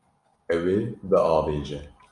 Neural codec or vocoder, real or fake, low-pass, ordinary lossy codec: none; real; 10.8 kHz; Opus, 64 kbps